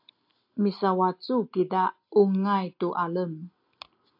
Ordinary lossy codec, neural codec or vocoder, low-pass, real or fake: AAC, 48 kbps; none; 5.4 kHz; real